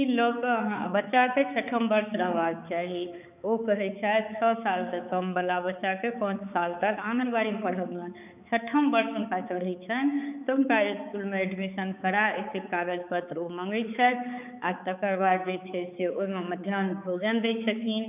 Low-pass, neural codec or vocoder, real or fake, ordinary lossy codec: 3.6 kHz; codec, 16 kHz, 4 kbps, X-Codec, HuBERT features, trained on balanced general audio; fake; none